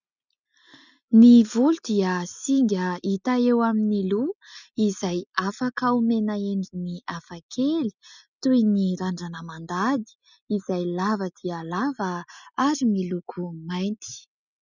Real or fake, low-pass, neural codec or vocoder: real; 7.2 kHz; none